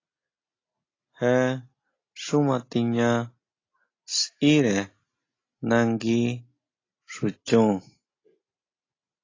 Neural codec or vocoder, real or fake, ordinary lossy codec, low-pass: none; real; AAC, 32 kbps; 7.2 kHz